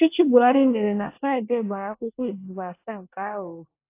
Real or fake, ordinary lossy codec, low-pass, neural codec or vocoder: fake; AAC, 24 kbps; 3.6 kHz; codec, 24 kHz, 1 kbps, SNAC